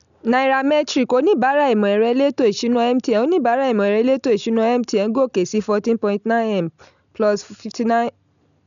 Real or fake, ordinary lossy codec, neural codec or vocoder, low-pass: real; none; none; 7.2 kHz